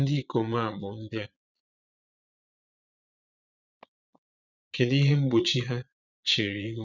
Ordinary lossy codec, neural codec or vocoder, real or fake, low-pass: none; vocoder, 22.05 kHz, 80 mel bands, Vocos; fake; 7.2 kHz